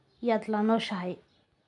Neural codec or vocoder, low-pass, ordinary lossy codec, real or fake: none; 10.8 kHz; none; real